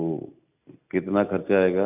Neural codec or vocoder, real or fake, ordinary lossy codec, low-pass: none; real; none; 3.6 kHz